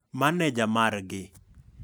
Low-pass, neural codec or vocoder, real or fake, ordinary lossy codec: none; none; real; none